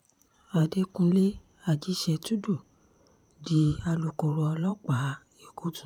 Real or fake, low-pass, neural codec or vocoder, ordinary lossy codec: fake; 19.8 kHz; vocoder, 44.1 kHz, 128 mel bands every 512 samples, BigVGAN v2; none